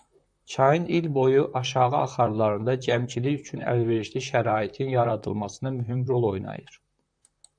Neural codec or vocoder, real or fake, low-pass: vocoder, 44.1 kHz, 128 mel bands, Pupu-Vocoder; fake; 9.9 kHz